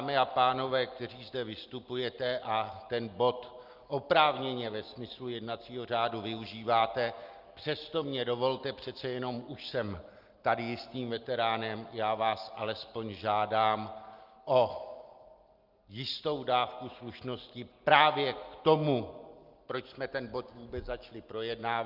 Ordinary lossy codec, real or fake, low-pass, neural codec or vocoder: Opus, 32 kbps; real; 5.4 kHz; none